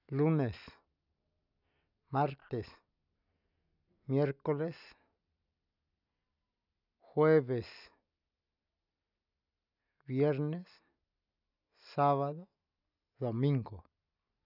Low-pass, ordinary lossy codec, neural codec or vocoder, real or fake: 5.4 kHz; none; none; real